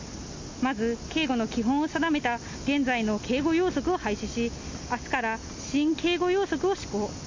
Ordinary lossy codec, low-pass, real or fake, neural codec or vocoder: MP3, 48 kbps; 7.2 kHz; real; none